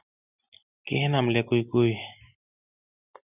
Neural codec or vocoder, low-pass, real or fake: none; 3.6 kHz; real